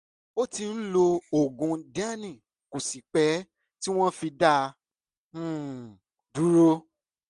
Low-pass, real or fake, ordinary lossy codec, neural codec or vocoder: 10.8 kHz; real; MP3, 64 kbps; none